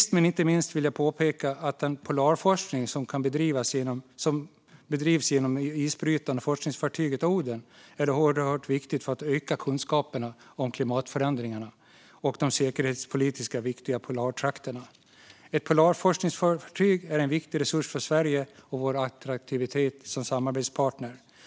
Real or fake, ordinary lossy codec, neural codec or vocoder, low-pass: real; none; none; none